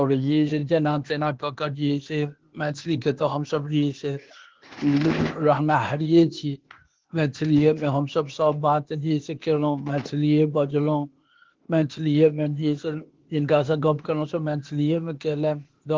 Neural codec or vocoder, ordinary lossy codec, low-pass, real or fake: codec, 16 kHz, 0.8 kbps, ZipCodec; Opus, 16 kbps; 7.2 kHz; fake